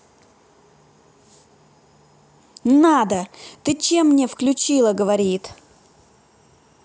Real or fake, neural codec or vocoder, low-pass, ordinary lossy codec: real; none; none; none